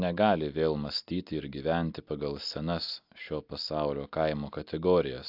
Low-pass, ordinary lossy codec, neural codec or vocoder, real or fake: 5.4 kHz; AAC, 48 kbps; none; real